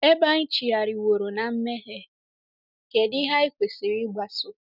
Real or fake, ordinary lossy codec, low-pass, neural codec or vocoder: real; none; 5.4 kHz; none